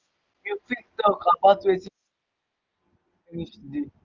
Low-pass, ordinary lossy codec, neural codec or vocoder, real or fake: 7.2 kHz; Opus, 24 kbps; none; real